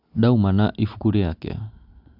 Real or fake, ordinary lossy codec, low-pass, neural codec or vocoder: real; none; 5.4 kHz; none